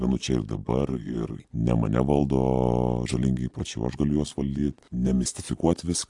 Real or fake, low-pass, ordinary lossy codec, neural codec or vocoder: fake; 10.8 kHz; AAC, 64 kbps; vocoder, 44.1 kHz, 128 mel bands every 256 samples, BigVGAN v2